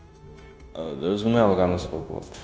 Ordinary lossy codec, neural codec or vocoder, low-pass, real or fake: none; codec, 16 kHz, 0.9 kbps, LongCat-Audio-Codec; none; fake